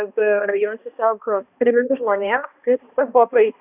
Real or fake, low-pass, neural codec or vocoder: fake; 3.6 kHz; codec, 16 kHz, 1 kbps, X-Codec, HuBERT features, trained on balanced general audio